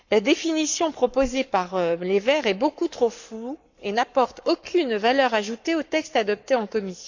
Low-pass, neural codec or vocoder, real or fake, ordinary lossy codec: 7.2 kHz; codec, 44.1 kHz, 7.8 kbps, Pupu-Codec; fake; none